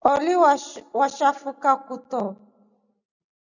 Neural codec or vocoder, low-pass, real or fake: none; 7.2 kHz; real